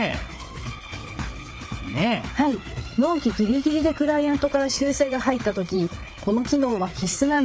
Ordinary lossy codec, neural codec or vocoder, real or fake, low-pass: none; codec, 16 kHz, 4 kbps, FreqCodec, larger model; fake; none